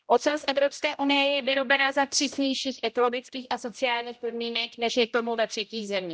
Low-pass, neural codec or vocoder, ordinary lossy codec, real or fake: none; codec, 16 kHz, 0.5 kbps, X-Codec, HuBERT features, trained on general audio; none; fake